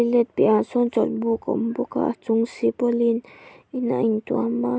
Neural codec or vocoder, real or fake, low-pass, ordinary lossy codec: none; real; none; none